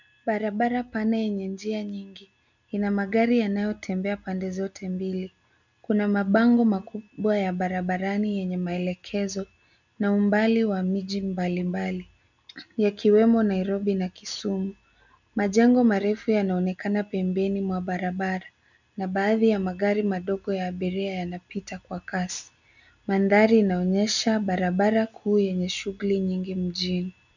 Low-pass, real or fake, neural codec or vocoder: 7.2 kHz; real; none